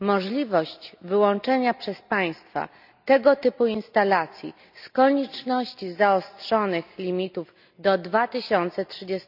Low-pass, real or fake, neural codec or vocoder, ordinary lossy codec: 5.4 kHz; real; none; none